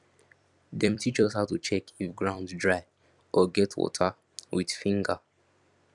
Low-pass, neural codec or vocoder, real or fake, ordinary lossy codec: 10.8 kHz; vocoder, 44.1 kHz, 128 mel bands every 256 samples, BigVGAN v2; fake; none